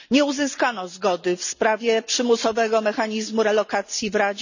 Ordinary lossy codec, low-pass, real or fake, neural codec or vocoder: none; 7.2 kHz; real; none